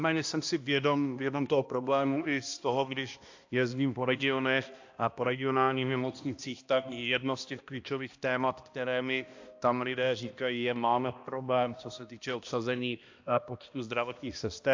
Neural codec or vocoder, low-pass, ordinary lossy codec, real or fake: codec, 16 kHz, 1 kbps, X-Codec, HuBERT features, trained on balanced general audio; 7.2 kHz; AAC, 48 kbps; fake